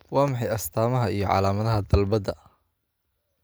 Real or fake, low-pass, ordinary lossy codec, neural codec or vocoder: real; none; none; none